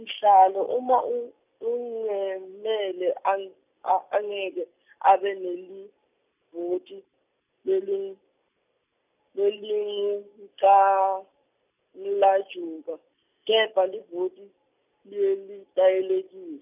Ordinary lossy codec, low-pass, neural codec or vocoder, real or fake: none; 3.6 kHz; none; real